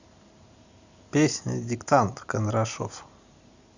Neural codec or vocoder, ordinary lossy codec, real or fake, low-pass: vocoder, 44.1 kHz, 128 mel bands every 512 samples, BigVGAN v2; Opus, 64 kbps; fake; 7.2 kHz